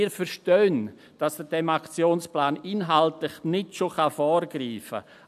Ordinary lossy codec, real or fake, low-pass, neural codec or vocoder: none; real; 14.4 kHz; none